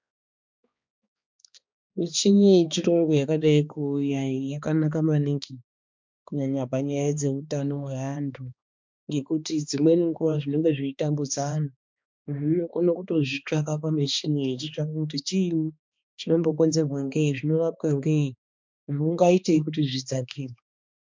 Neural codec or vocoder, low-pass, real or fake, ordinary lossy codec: codec, 16 kHz, 2 kbps, X-Codec, HuBERT features, trained on balanced general audio; 7.2 kHz; fake; MP3, 64 kbps